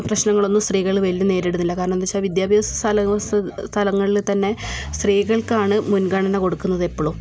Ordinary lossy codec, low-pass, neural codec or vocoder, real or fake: none; none; none; real